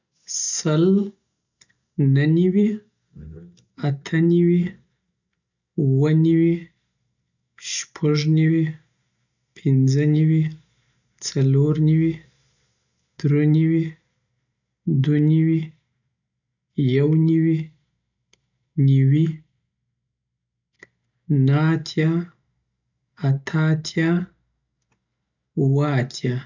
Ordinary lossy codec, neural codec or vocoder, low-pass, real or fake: none; none; 7.2 kHz; real